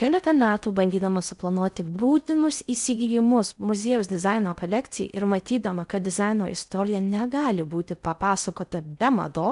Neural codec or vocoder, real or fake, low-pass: codec, 16 kHz in and 24 kHz out, 0.6 kbps, FocalCodec, streaming, 4096 codes; fake; 10.8 kHz